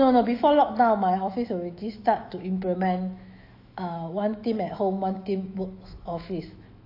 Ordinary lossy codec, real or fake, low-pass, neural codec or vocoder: AAC, 32 kbps; fake; 5.4 kHz; autoencoder, 48 kHz, 128 numbers a frame, DAC-VAE, trained on Japanese speech